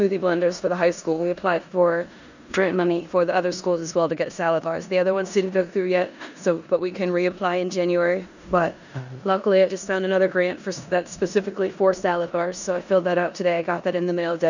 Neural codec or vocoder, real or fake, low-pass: codec, 16 kHz in and 24 kHz out, 0.9 kbps, LongCat-Audio-Codec, four codebook decoder; fake; 7.2 kHz